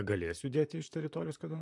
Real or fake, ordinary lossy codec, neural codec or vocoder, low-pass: fake; MP3, 64 kbps; vocoder, 44.1 kHz, 128 mel bands, Pupu-Vocoder; 10.8 kHz